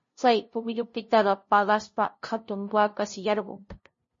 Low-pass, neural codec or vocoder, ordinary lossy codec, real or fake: 7.2 kHz; codec, 16 kHz, 0.5 kbps, FunCodec, trained on LibriTTS, 25 frames a second; MP3, 32 kbps; fake